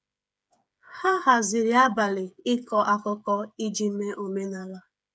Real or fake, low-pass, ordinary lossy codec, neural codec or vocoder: fake; none; none; codec, 16 kHz, 8 kbps, FreqCodec, smaller model